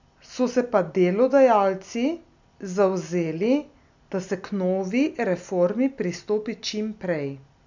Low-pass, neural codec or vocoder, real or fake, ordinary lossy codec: 7.2 kHz; none; real; none